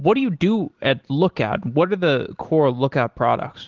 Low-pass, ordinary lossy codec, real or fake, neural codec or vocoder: 7.2 kHz; Opus, 16 kbps; real; none